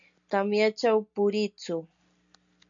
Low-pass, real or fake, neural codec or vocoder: 7.2 kHz; real; none